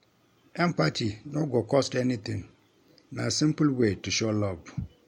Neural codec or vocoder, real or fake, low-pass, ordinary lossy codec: none; real; 19.8 kHz; MP3, 64 kbps